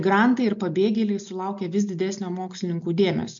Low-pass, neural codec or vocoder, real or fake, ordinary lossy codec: 7.2 kHz; none; real; MP3, 64 kbps